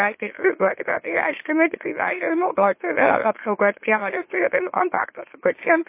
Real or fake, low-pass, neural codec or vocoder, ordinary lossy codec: fake; 3.6 kHz; autoencoder, 44.1 kHz, a latent of 192 numbers a frame, MeloTTS; MP3, 24 kbps